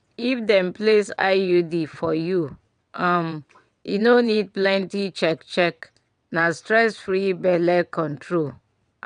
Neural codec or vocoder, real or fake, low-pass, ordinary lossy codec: vocoder, 22.05 kHz, 80 mel bands, WaveNeXt; fake; 9.9 kHz; none